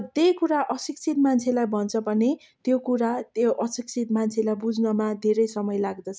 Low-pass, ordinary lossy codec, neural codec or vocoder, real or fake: none; none; none; real